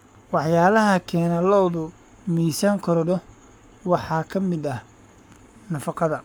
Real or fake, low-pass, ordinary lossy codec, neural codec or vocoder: fake; none; none; codec, 44.1 kHz, 7.8 kbps, Pupu-Codec